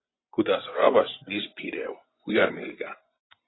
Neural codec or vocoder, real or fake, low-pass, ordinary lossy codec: vocoder, 24 kHz, 100 mel bands, Vocos; fake; 7.2 kHz; AAC, 16 kbps